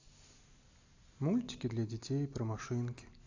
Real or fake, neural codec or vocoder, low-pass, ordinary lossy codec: real; none; 7.2 kHz; AAC, 48 kbps